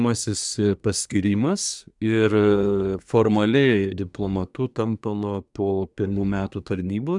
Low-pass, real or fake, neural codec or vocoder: 10.8 kHz; fake; codec, 24 kHz, 1 kbps, SNAC